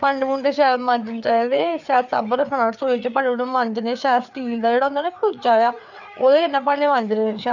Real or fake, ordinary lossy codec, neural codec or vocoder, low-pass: fake; none; codec, 16 kHz, 4 kbps, FreqCodec, larger model; 7.2 kHz